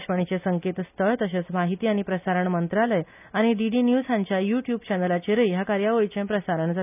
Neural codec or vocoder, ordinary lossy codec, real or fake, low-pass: none; none; real; 3.6 kHz